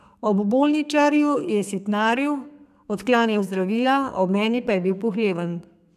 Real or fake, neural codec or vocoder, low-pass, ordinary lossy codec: fake; codec, 32 kHz, 1.9 kbps, SNAC; 14.4 kHz; none